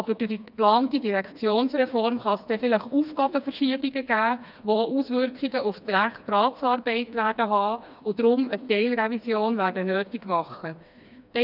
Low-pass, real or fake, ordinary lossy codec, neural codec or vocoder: 5.4 kHz; fake; none; codec, 16 kHz, 2 kbps, FreqCodec, smaller model